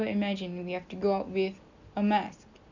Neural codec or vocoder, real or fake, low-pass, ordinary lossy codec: none; real; 7.2 kHz; none